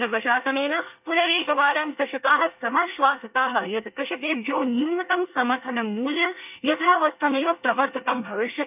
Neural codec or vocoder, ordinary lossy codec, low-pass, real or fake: codec, 24 kHz, 1 kbps, SNAC; none; 3.6 kHz; fake